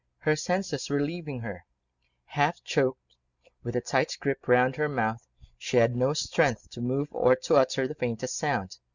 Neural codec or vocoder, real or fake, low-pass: none; real; 7.2 kHz